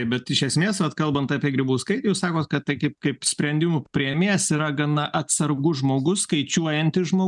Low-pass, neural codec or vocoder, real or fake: 10.8 kHz; none; real